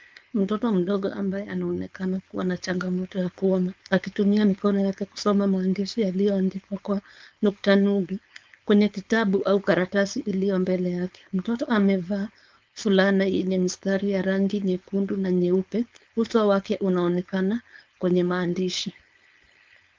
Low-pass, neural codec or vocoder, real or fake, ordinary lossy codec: 7.2 kHz; codec, 16 kHz, 4.8 kbps, FACodec; fake; Opus, 24 kbps